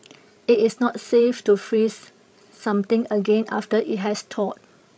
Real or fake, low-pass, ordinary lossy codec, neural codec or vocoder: fake; none; none; codec, 16 kHz, 16 kbps, FreqCodec, larger model